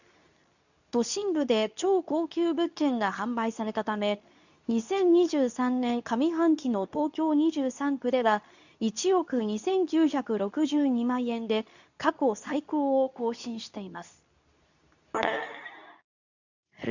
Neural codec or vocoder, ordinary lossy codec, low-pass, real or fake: codec, 24 kHz, 0.9 kbps, WavTokenizer, medium speech release version 2; none; 7.2 kHz; fake